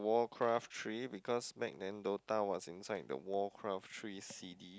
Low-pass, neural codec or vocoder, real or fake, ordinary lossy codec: none; none; real; none